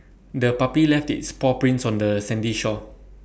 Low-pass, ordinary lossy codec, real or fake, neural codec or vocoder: none; none; real; none